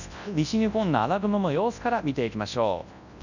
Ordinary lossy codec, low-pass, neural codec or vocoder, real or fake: none; 7.2 kHz; codec, 24 kHz, 0.9 kbps, WavTokenizer, large speech release; fake